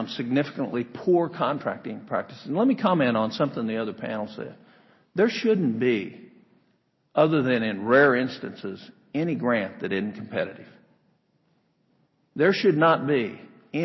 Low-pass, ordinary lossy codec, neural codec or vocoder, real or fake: 7.2 kHz; MP3, 24 kbps; none; real